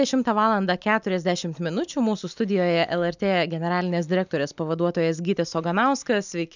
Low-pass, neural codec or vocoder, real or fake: 7.2 kHz; none; real